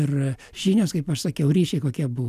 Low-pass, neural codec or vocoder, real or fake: 14.4 kHz; none; real